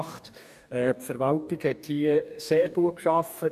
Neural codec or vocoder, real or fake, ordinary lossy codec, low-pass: codec, 44.1 kHz, 2.6 kbps, DAC; fake; none; 14.4 kHz